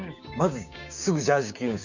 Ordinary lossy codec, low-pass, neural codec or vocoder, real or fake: none; 7.2 kHz; codec, 16 kHz, 6 kbps, DAC; fake